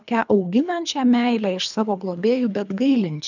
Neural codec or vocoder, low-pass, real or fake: codec, 24 kHz, 3 kbps, HILCodec; 7.2 kHz; fake